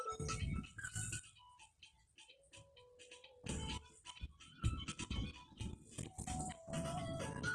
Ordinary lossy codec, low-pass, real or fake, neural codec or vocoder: Opus, 16 kbps; 10.8 kHz; real; none